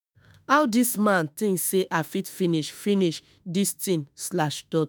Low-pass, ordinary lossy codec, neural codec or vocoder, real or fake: none; none; autoencoder, 48 kHz, 32 numbers a frame, DAC-VAE, trained on Japanese speech; fake